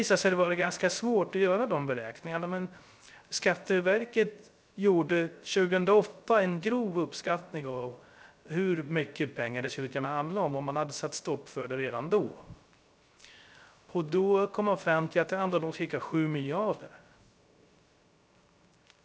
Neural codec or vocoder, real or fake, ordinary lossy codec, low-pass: codec, 16 kHz, 0.3 kbps, FocalCodec; fake; none; none